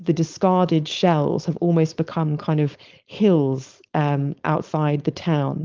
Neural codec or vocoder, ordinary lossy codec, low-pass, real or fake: codec, 16 kHz, 4.8 kbps, FACodec; Opus, 32 kbps; 7.2 kHz; fake